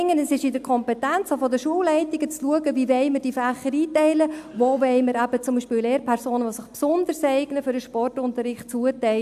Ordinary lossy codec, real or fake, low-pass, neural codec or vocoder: AAC, 96 kbps; real; 14.4 kHz; none